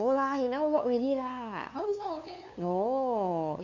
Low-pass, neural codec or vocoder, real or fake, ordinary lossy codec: 7.2 kHz; codec, 16 kHz, 2 kbps, FunCodec, trained on LibriTTS, 25 frames a second; fake; none